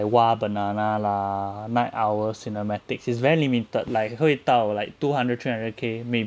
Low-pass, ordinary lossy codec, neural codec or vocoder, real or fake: none; none; none; real